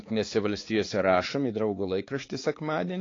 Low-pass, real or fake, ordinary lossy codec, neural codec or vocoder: 7.2 kHz; fake; AAC, 32 kbps; codec, 16 kHz, 4 kbps, X-Codec, HuBERT features, trained on balanced general audio